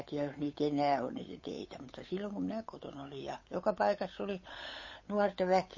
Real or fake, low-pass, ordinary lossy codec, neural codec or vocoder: fake; 7.2 kHz; MP3, 32 kbps; codec, 16 kHz, 8 kbps, FunCodec, trained on Chinese and English, 25 frames a second